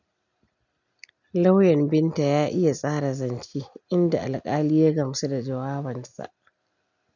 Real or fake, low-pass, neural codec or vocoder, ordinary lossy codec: real; 7.2 kHz; none; none